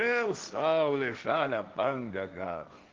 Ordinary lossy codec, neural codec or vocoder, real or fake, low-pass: Opus, 24 kbps; codec, 16 kHz, 1.1 kbps, Voila-Tokenizer; fake; 7.2 kHz